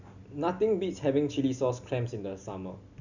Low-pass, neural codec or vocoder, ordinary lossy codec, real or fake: 7.2 kHz; none; none; real